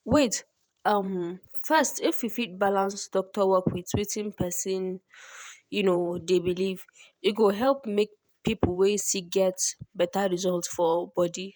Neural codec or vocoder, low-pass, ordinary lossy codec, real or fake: vocoder, 48 kHz, 128 mel bands, Vocos; none; none; fake